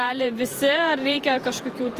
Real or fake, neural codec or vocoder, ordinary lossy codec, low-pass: fake; vocoder, 48 kHz, 128 mel bands, Vocos; Opus, 24 kbps; 14.4 kHz